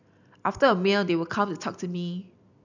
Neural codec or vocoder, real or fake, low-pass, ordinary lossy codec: none; real; 7.2 kHz; none